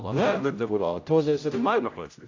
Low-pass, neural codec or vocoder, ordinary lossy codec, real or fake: 7.2 kHz; codec, 16 kHz, 0.5 kbps, X-Codec, HuBERT features, trained on general audio; AAC, 48 kbps; fake